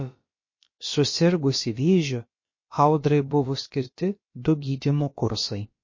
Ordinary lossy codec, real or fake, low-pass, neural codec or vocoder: MP3, 32 kbps; fake; 7.2 kHz; codec, 16 kHz, about 1 kbps, DyCAST, with the encoder's durations